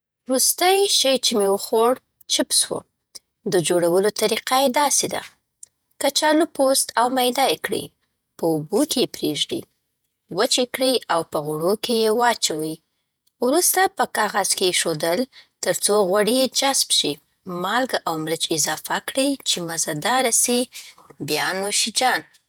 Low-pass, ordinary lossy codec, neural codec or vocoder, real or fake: none; none; vocoder, 48 kHz, 128 mel bands, Vocos; fake